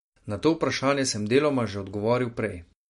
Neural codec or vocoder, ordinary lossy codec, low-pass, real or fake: none; MP3, 48 kbps; 19.8 kHz; real